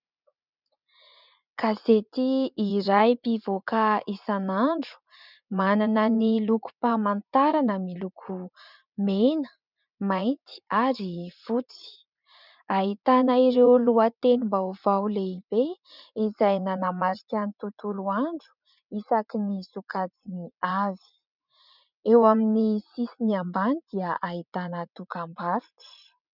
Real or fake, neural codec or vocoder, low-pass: fake; vocoder, 44.1 kHz, 80 mel bands, Vocos; 5.4 kHz